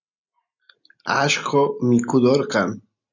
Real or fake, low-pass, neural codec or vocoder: real; 7.2 kHz; none